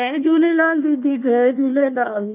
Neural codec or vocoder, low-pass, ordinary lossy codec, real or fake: codec, 16 kHz, 1 kbps, FunCodec, trained on Chinese and English, 50 frames a second; 3.6 kHz; none; fake